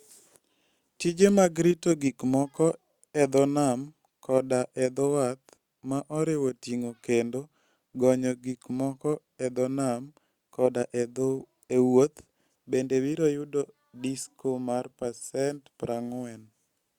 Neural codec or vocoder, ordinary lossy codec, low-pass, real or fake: none; Opus, 64 kbps; 19.8 kHz; real